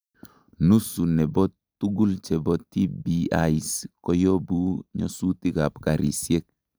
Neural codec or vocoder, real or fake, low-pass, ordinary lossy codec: none; real; none; none